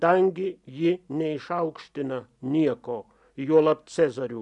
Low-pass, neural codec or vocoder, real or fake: 10.8 kHz; none; real